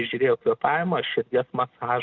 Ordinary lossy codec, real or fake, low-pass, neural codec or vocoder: Opus, 32 kbps; real; 7.2 kHz; none